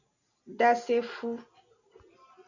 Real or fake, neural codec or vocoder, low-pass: real; none; 7.2 kHz